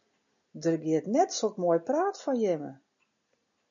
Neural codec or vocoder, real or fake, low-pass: none; real; 7.2 kHz